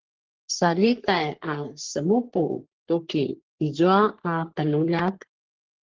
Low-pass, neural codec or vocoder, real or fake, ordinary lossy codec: 7.2 kHz; codec, 44.1 kHz, 3.4 kbps, Pupu-Codec; fake; Opus, 16 kbps